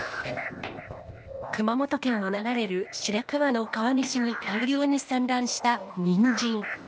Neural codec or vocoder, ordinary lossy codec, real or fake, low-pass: codec, 16 kHz, 0.8 kbps, ZipCodec; none; fake; none